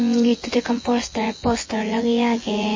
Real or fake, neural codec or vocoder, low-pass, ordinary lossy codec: fake; vocoder, 24 kHz, 100 mel bands, Vocos; 7.2 kHz; MP3, 32 kbps